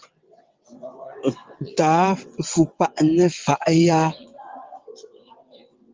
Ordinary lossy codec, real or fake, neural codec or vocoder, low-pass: Opus, 32 kbps; fake; vocoder, 22.05 kHz, 80 mel bands, WaveNeXt; 7.2 kHz